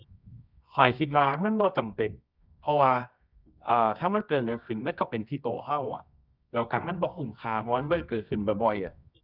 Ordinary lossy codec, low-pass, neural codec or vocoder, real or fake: none; 5.4 kHz; codec, 24 kHz, 0.9 kbps, WavTokenizer, medium music audio release; fake